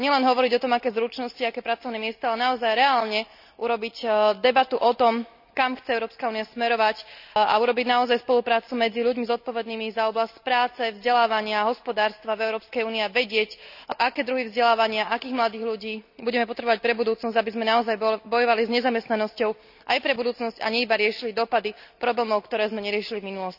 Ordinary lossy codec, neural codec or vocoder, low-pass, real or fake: none; none; 5.4 kHz; real